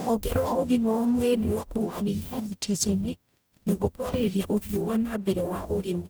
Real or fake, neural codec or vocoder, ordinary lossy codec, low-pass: fake; codec, 44.1 kHz, 0.9 kbps, DAC; none; none